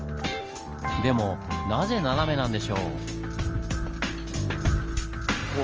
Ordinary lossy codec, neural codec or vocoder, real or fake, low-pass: Opus, 24 kbps; none; real; 7.2 kHz